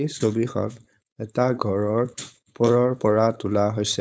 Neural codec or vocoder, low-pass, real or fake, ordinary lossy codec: codec, 16 kHz, 4.8 kbps, FACodec; none; fake; none